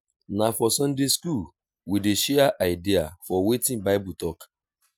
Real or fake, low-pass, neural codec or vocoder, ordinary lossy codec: real; none; none; none